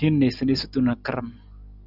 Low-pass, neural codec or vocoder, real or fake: 5.4 kHz; none; real